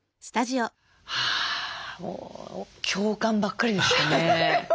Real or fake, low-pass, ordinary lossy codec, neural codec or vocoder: real; none; none; none